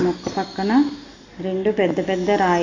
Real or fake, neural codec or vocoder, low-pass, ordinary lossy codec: real; none; 7.2 kHz; AAC, 32 kbps